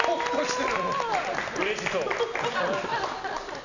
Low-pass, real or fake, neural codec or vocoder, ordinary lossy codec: 7.2 kHz; real; none; none